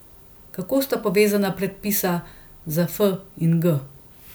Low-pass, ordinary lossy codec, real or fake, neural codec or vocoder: none; none; real; none